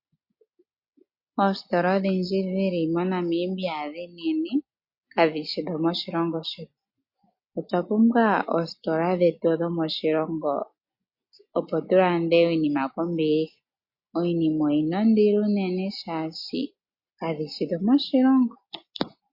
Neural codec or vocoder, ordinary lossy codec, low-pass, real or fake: none; MP3, 32 kbps; 5.4 kHz; real